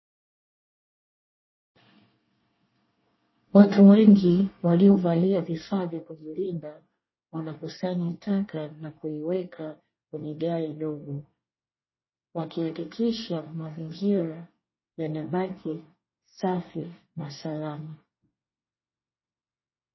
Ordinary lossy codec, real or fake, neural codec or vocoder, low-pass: MP3, 24 kbps; fake; codec, 24 kHz, 1 kbps, SNAC; 7.2 kHz